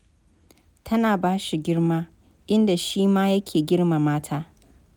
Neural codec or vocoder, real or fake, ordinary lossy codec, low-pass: none; real; none; none